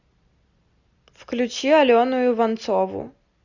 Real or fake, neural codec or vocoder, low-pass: real; none; 7.2 kHz